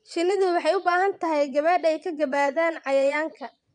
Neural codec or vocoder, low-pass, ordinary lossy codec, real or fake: vocoder, 22.05 kHz, 80 mel bands, Vocos; 9.9 kHz; none; fake